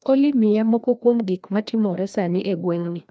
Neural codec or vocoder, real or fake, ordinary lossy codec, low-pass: codec, 16 kHz, 1 kbps, FreqCodec, larger model; fake; none; none